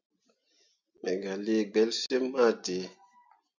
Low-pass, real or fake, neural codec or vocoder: 7.2 kHz; real; none